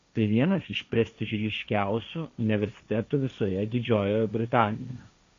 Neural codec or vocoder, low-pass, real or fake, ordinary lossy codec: codec, 16 kHz, 1.1 kbps, Voila-Tokenizer; 7.2 kHz; fake; MP3, 64 kbps